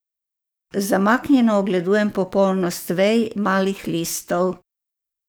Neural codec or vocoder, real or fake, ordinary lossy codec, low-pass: codec, 44.1 kHz, 7.8 kbps, DAC; fake; none; none